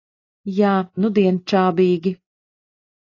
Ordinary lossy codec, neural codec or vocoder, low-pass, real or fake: AAC, 32 kbps; none; 7.2 kHz; real